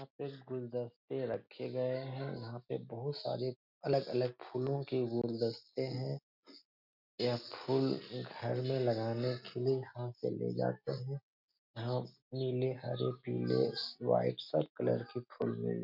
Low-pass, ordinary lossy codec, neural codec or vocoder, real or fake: 5.4 kHz; none; none; real